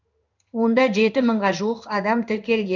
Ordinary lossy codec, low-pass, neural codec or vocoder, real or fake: none; 7.2 kHz; codec, 16 kHz in and 24 kHz out, 1 kbps, XY-Tokenizer; fake